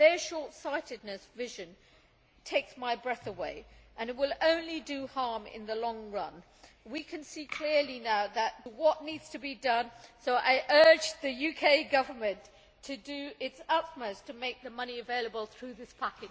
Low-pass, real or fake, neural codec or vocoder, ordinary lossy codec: none; real; none; none